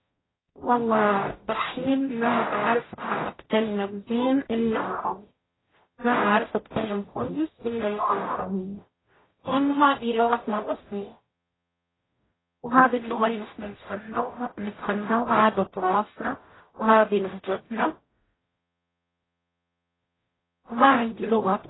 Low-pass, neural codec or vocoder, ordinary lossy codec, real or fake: 7.2 kHz; codec, 44.1 kHz, 0.9 kbps, DAC; AAC, 16 kbps; fake